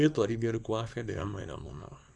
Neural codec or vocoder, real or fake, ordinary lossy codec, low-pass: codec, 24 kHz, 0.9 kbps, WavTokenizer, small release; fake; none; none